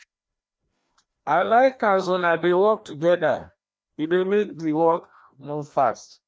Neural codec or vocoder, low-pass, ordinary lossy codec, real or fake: codec, 16 kHz, 1 kbps, FreqCodec, larger model; none; none; fake